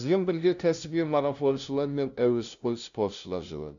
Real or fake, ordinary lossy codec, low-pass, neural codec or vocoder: fake; none; 7.2 kHz; codec, 16 kHz, 0.5 kbps, FunCodec, trained on LibriTTS, 25 frames a second